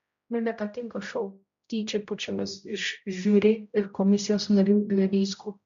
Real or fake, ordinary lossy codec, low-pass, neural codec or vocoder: fake; MP3, 64 kbps; 7.2 kHz; codec, 16 kHz, 0.5 kbps, X-Codec, HuBERT features, trained on general audio